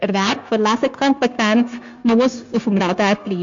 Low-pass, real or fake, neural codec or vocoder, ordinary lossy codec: 7.2 kHz; fake; codec, 16 kHz, 0.9 kbps, LongCat-Audio-Codec; MP3, 48 kbps